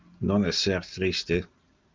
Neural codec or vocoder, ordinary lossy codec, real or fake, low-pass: none; Opus, 24 kbps; real; 7.2 kHz